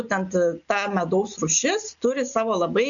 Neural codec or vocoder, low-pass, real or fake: none; 7.2 kHz; real